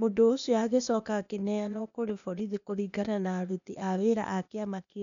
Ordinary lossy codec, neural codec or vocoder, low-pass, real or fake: none; codec, 16 kHz, 0.8 kbps, ZipCodec; 7.2 kHz; fake